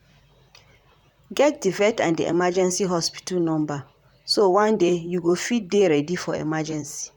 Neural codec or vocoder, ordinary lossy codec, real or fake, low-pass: vocoder, 44.1 kHz, 128 mel bands, Pupu-Vocoder; none; fake; 19.8 kHz